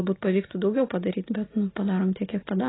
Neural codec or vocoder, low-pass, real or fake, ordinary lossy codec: none; 7.2 kHz; real; AAC, 16 kbps